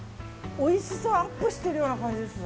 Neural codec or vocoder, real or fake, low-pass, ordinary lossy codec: none; real; none; none